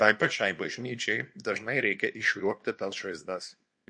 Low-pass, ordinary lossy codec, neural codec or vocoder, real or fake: 9.9 kHz; MP3, 48 kbps; codec, 24 kHz, 0.9 kbps, WavTokenizer, small release; fake